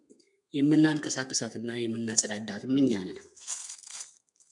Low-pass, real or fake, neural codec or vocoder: 10.8 kHz; fake; codec, 32 kHz, 1.9 kbps, SNAC